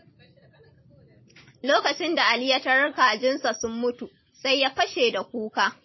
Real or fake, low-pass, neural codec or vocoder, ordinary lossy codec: real; 7.2 kHz; none; MP3, 24 kbps